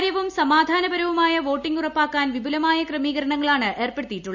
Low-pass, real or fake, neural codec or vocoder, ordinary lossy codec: 7.2 kHz; real; none; Opus, 64 kbps